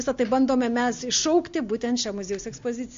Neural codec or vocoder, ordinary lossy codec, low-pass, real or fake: none; MP3, 48 kbps; 7.2 kHz; real